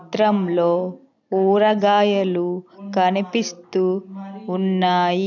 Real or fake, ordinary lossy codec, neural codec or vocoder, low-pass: real; none; none; 7.2 kHz